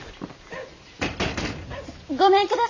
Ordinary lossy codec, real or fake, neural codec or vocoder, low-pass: AAC, 48 kbps; real; none; 7.2 kHz